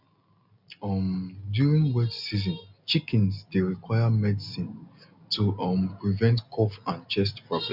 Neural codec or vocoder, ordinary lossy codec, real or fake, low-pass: none; none; real; 5.4 kHz